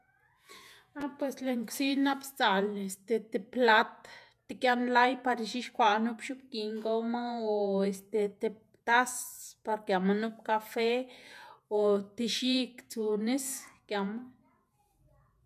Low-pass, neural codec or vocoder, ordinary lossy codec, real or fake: 14.4 kHz; vocoder, 48 kHz, 128 mel bands, Vocos; none; fake